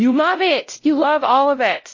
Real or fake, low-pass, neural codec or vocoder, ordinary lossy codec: fake; 7.2 kHz; codec, 16 kHz, 0.5 kbps, X-Codec, WavLM features, trained on Multilingual LibriSpeech; MP3, 32 kbps